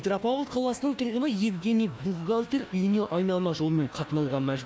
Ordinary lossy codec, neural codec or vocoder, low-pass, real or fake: none; codec, 16 kHz, 1 kbps, FunCodec, trained on Chinese and English, 50 frames a second; none; fake